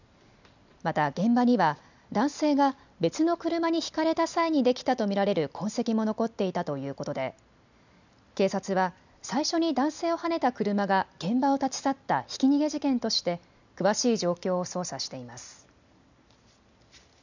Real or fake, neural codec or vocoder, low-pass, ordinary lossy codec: real; none; 7.2 kHz; none